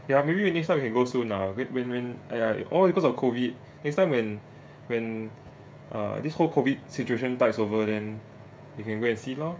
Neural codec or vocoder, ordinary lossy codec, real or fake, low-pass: codec, 16 kHz, 16 kbps, FreqCodec, smaller model; none; fake; none